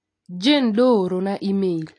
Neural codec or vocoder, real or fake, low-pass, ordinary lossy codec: none; real; 9.9 kHz; AAC, 48 kbps